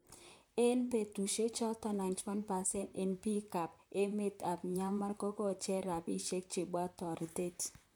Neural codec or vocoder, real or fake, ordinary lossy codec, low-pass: vocoder, 44.1 kHz, 128 mel bands, Pupu-Vocoder; fake; none; none